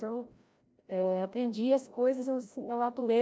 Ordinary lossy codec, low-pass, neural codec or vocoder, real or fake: none; none; codec, 16 kHz, 0.5 kbps, FreqCodec, larger model; fake